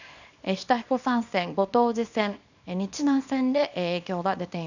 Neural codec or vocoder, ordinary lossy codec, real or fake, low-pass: codec, 24 kHz, 0.9 kbps, WavTokenizer, small release; none; fake; 7.2 kHz